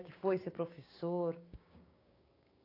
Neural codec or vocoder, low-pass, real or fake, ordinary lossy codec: codec, 16 kHz, 6 kbps, DAC; 5.4 kHz; fake; AAC, 24 kbps